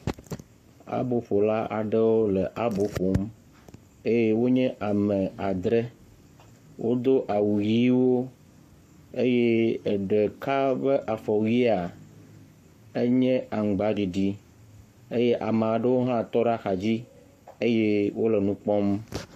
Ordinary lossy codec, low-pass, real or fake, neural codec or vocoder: MP3, 64 kbps; 14.4 kHz; fake; codec, 44.1 kHz, 7.8 kbps, Pupu-Codec